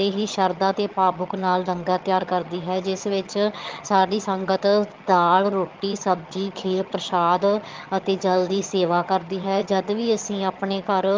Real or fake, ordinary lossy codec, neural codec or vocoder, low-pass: fake; Opus, 32 kbps; vocoder, 22.05 kHz, 80 mel bands, HiFi-GAN; 7.2 kHz